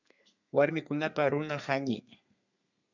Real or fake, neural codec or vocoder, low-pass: fake; codec, 32 kHz, 1.9 kbps, SNAC; 7.2 kHz